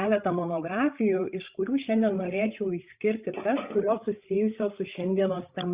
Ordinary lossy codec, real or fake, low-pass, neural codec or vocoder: Opus, 64 kbps; fake; 3.6 kHz; codec, 16 kHz, 8 kbps, FreqCodec, larger model